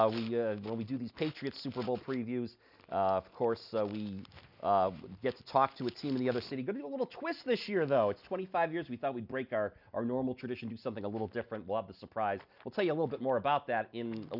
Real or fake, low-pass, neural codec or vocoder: real; 5.4 kHz; none